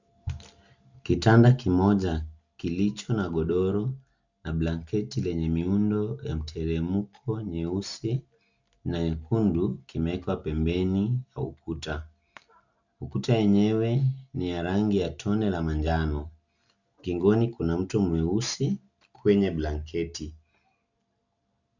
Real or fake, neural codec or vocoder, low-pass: real; none; 7.2 kHz